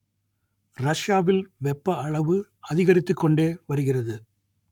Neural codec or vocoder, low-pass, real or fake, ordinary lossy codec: codec, 44.1 kHz, 7.8 kbps, Pupu-Codec; 19.8 kHz; fake; none